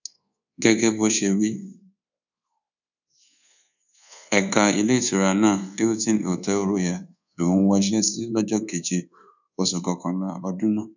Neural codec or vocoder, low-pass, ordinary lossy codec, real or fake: codec, 24 kHz, 1.2 kbps, DualCodec; 7.2 kHz; none; fake